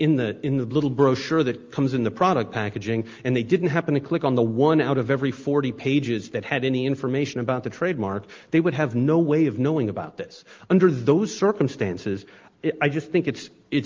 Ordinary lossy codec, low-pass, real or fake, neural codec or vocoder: Opus, 32 kbps; 7.2 kHz; real; none